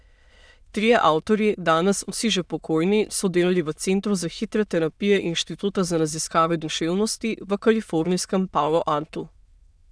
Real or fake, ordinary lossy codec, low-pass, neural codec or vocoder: fake; none; none; autoencoder, 22.05 kHz, a latent of 192 numbers a frame, VITS, trained on many speakers